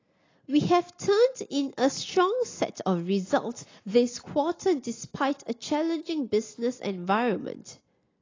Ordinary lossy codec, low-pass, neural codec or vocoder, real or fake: AAC, 32 kbps; 7.2 kHz; none; real